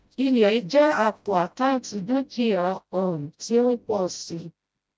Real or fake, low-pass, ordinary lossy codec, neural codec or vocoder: fake; none; none; codec, 16 kHz, 0.5 kbps, FreqCodec, smaller model